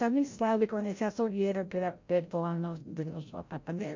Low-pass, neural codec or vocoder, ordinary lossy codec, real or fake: 7.2 kHz; codec, 16 kHz, 0.5 kbps, FreqCodec, larger model; MP3, 48 kbps; fake